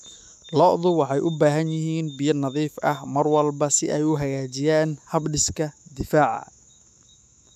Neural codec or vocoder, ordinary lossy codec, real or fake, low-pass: autoencoder, 48 kHz, 128 numbers a frame, DAC-VAE, trained on Japanese speech; none; fake; 14.4 kHz